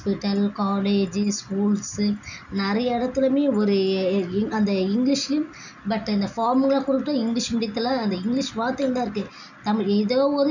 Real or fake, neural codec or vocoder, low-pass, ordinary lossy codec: real; none; 7.2 kHz; none